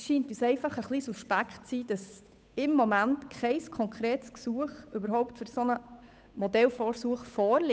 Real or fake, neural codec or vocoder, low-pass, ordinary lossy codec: fake; codec, 16 kHz, 8 kbps, FunCodec, trained on Chinese and English, 25 frames a second; none; none